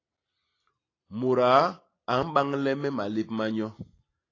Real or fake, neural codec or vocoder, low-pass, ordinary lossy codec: real; none; 7.2 kHz; AAC, 32 kbps